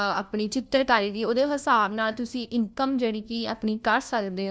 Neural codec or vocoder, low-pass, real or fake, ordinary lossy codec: codec, 16 kHz, 1 kbps, FunCodec, trained on LibriTTS, 50 frames a second; none; fake; none